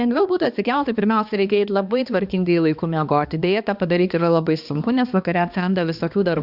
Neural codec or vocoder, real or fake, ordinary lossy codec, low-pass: codec, 16 kHz, 2 kbps, X-Codec, HuBERT features, trained on balanced general audio; fake; Opus, 64 kbps; 5.4 kHz